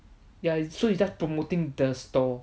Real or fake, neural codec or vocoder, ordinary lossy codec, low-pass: real; none; none; none